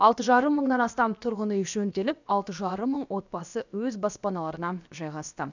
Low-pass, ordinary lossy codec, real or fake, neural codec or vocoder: 7.2 kHz; none; fake; codec, 16 kHz, about 1 kbps, DyCAST, with the encoder's durations